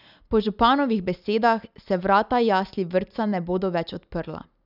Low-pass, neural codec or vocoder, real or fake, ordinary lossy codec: 5.4 kHz; none; real; none